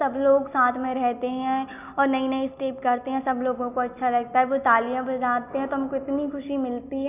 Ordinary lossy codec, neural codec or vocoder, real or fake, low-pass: none; none; real; 3.6 kHz